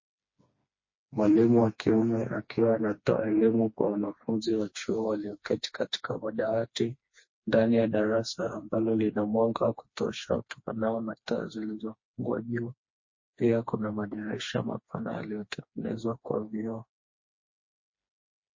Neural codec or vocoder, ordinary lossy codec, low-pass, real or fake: codec, 16 kHz, 2 kbps, FreqCodec, smaller model; MP3, 32 kbps; 7.2 kHz; fake